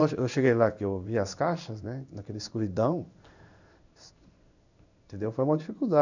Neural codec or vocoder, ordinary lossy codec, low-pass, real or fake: codec, 16 kHz in and 24 kHz out, 1 kbps, XY-Tokenizer; none; 7.2 kHz; fake